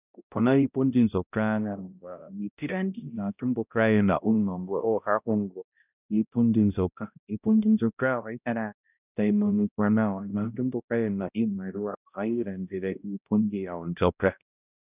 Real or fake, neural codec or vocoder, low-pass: fake; codec, 16 kHz, 0.5 kbps, X-Codec, HuBERT features, trained on balanced general audio; 3.6 kHz